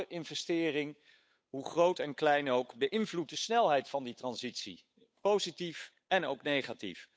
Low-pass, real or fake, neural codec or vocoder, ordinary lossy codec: none; fake; codec, 16 kHz, 8 kbps, FunCodec, trained on Chinese and English, 25 frames a second; none